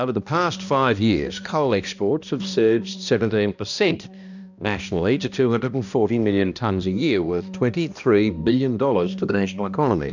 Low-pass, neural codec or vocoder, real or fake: 7.2 kHz; codec, 16 kHz, 1 kbps, X-Codec, HuBERT features, trained on balanced general audio; fake